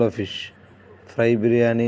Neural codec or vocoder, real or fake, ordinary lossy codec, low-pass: none; real; none; none